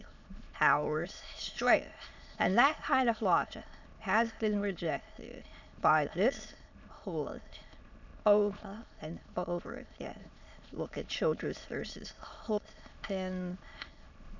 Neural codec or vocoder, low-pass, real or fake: autoencoder, 22.05 kHz, a latent of 192 numbers a frame, VITS, trained on many speakers; 7.2 kHz; fake